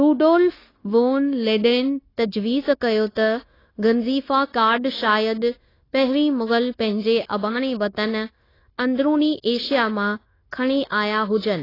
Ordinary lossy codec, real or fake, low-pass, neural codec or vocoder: AAC, 24 kbps; fake; 5.4 kHz; codec, 16 kHz, 0.9 kbps, LongCat-Audio-Codec